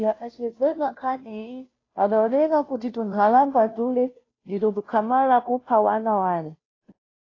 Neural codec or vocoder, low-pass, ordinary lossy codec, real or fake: codec, 16 kHz, 0.5 kbps, FunCodec, trained on Chinese and English, 25 frames a second; 7.2 kHz; AAC, 32 kbps; fake